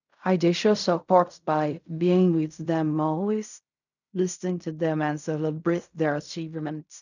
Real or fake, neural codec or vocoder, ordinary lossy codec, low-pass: fake; codec, 16 kHz in and 24 kHz out, 0.4 kbps, LongCat-Audio-Codec, fine tuned four codebook decoder; none; 7.2 kHz